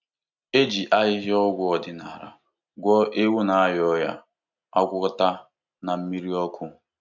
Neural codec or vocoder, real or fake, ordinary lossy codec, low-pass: none; real; none; 7.2 kHz